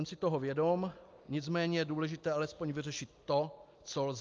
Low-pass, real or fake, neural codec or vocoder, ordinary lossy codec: 7.2 kHz; real; none; Opus, 24 kbps